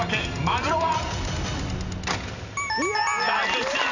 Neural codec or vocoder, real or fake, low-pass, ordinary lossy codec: none; real; 7.2 kHz; none